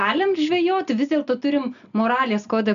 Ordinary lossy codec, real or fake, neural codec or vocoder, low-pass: MP3, 96 kbps; real; none; 7.2 kHz